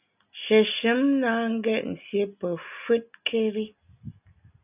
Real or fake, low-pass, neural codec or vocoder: real; 3.6 kHz; none